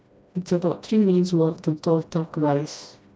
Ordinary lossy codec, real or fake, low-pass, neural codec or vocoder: none; fake; none; codec, 16 kHz, 1 kbps, FreqCodec, smaller model